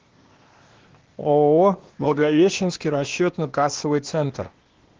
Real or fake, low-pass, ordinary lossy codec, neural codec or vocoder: fake; 7.2 kHz; Opus, 16 kbps; codec, 16 kHz, 0.8 kbps, ZipCodec